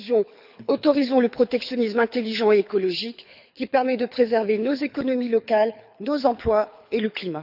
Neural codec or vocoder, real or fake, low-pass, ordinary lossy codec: codec, 24 kHz, 6 kbps, HILCodec; fake; 5.4 kHz; none